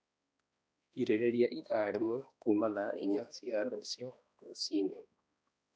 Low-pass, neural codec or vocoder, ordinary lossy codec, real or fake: none; codec, 16 kHz, 1 kbps, X-Codec, HuBERT features, trained on balanced general audio; none; fake